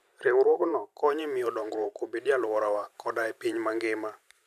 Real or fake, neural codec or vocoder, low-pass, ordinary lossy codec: fake; vocoder, 44.1 kHz, 128 mel bands every 512 samples, BigVGAN v2; 14.4 kHz; none